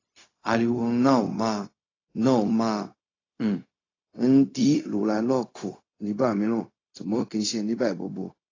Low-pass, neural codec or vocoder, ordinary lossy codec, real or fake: 7.2 kHz; codec, 16 kHz, 0.4 kbps, LongCat-Audio-Codec; AAC, 32 kbps; fake